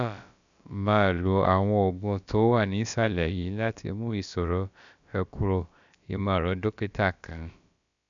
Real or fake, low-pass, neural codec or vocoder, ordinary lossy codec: fake; 7.2 kHz; codec, 16 kHz, about 1 kbps, DyCAST, with the encoder's durations; none